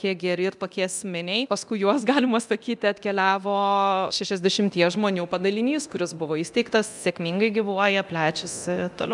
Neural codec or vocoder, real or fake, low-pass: codec, 24 kHz, 0.9 kbps, DualCodec; fake; 10.8 kHz